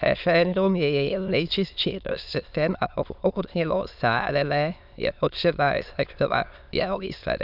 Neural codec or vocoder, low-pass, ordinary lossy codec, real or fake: autoencoder, 22.05 kHz, a latent of 192 numbers a frame, VITS, trained on many speakers; 5.4 kHz; none; fake